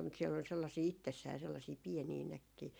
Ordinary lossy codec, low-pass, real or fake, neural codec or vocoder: none; none; fake; vocoder, 44.1 kHz, 128 mel bands every 512 samples, BigVGAN v2